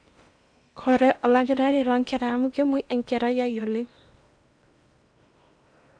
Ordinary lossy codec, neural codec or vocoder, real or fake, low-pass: none; codec, 16 kHz in and 24 kHz out, 0.8 kbps, FocalCodec, streaming, 65536 codes; fake; 9.9 kHz